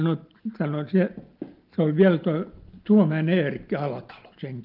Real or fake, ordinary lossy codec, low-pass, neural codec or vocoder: real; Opus, 32 kbps; 5.4 kHz; none